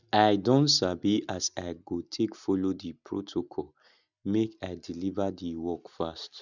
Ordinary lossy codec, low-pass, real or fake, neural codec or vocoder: none; 7.2 kHz; real; none